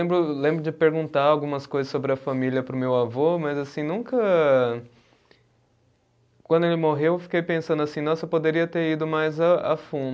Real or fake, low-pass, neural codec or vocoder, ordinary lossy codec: real; none; none; none